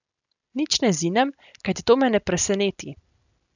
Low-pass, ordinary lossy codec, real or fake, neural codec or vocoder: 7.2 kHz; none; real; none